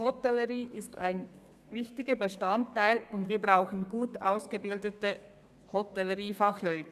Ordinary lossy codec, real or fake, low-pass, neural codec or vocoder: none; fake; 14.4 kHz; codec, 32 kHz, 1.9 kbps, SNAC